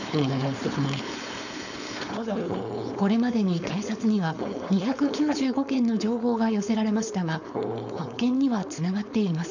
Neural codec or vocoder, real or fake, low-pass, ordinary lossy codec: codec, 16 kHz, 4.8 kbps, FACodec; fake; 7.2 kHz; none